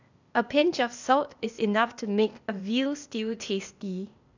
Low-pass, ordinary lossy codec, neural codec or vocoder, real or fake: 7.2 kHz; none; codec, 16 kHz, 0.8 kbps, ZipCodec; fake